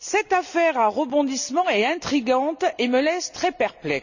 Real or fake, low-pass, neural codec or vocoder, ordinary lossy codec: real; 7.2 kHz; none; none